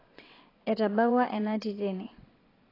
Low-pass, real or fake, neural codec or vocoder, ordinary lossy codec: 5.4 kHz; fake; vocoder, 22.05 kHz, 80 mel bands, WaveNeXt; AAC, 24 kbps